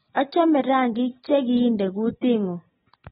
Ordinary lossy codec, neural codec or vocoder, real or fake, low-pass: AAC, 16 kbps; none; real; 19.8 kHz